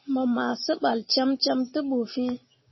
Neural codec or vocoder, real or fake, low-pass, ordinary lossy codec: none; real; 7.2 kHz; MP3, 24 kbps